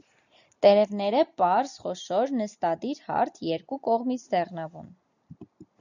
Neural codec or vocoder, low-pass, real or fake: none; 7.2 kHz; real